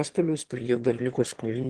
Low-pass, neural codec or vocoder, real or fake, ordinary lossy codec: 9.9 kHz; autoencoder, 22.05 kHz, a latent of 192 numbers a frame, VITS, trained on one speaker; fake; Opus, 16 kbps